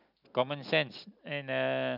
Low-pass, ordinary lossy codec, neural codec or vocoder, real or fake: 5.4 kHz; none; none; real